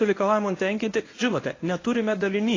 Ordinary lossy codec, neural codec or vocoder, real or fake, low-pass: AAC, 32 kbps; codec, 24 kHz, 0.9 kbps, WavTokenizer, medium speech release version 1; fake; 7.2 kHz